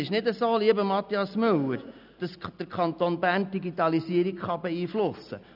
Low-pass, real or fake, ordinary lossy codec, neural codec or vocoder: 5.4 kHz; real; none; none